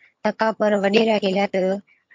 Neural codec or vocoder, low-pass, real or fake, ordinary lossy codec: vocoder, 22.05 kHz, 80 mel bands, HiFi-GAN; 7.2 kHz; fake; MP3, 48 kbps